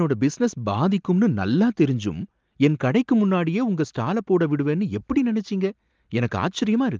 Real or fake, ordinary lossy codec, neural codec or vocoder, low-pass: real; Opus, 32 kbps; none; 7.2 kHz